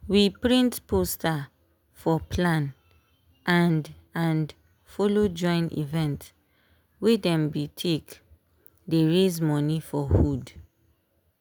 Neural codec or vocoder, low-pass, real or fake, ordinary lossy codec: none; none; real; none